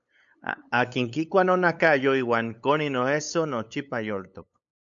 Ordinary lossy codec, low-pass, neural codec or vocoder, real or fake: MP3, 64 kbps; 7.2 kHz; codec, 16 kHz, 8 kbps, FunCodec, trained on LibriTTS, 25 frames a second; fake